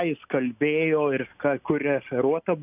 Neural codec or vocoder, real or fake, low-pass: none; real; 3.6 kHz